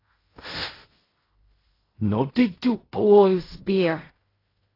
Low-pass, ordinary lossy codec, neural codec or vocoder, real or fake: 5.4 kHz; AAC, 24 kbps; codec, 16 kHz in and 24 kHz out, 0.4 kbps, LongCat-Audio-Codec, fine tuned four codebook decoder; fake